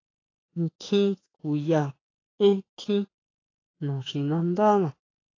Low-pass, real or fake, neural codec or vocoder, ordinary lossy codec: 7.2 kHz; fake; autoencoder, 48 kHz, 32 numbers a frame, DAC-VAE, trained on Japanese speech; AAC, 32 kbps